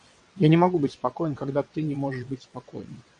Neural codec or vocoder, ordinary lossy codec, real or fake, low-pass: vocoder, 22.05 kHz, 80 mel bands, WaveNeXt; AAC, 64 kbps; fake; 9.9 kHz